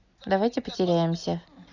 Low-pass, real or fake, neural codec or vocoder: 7.2 kHz; real; none